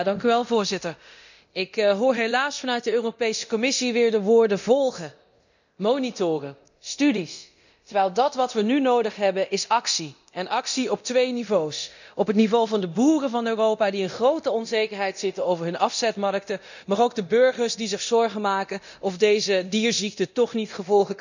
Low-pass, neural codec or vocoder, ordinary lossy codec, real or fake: 7.2 kHz; codec, 24 kHz, 0.9 kbps, DualCodec; none; fake